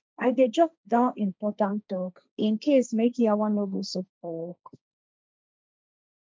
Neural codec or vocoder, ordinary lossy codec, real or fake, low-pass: codec, 16 kHz, 1.1 kbps, Voila-Tokenizer; none; fake; none